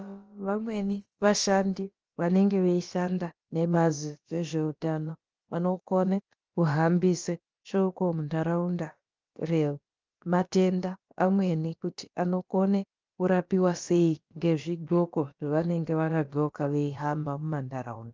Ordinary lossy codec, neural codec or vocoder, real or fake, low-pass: Opus, 24 kbps; codec, 16 kHz, about 1 kbps, DyCAST, with the encoder's durations; fake; 7.2 kHz